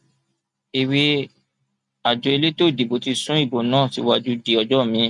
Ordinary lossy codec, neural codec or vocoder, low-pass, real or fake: none; none; 10.8 kHz; real